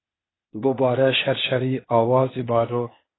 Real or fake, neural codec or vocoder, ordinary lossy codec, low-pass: fake; codec, 16 kHz, 0.8 kbps, ZipCodec; AAC, 16 kbps; 7.2 kHz